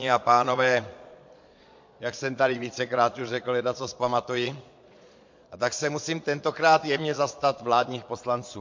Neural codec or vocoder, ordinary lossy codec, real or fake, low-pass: vocoder, 22.05 kHz, 80 mel bands, Vocos; MP3, 48 kbps; fake; 7.2 kHz